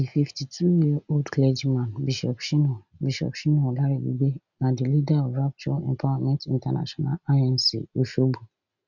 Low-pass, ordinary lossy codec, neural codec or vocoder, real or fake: 7.2 kHz; none; none; real